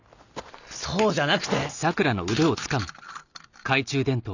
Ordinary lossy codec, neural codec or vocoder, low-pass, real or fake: none; none; 7.2 kHz; real